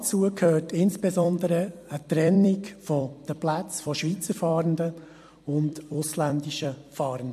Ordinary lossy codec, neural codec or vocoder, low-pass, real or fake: MP3, 64 kbps; vocoder, 44.1 kHz, 128 mel bands every 256 samples, BigVGAN v2; 14.4 kHz; fake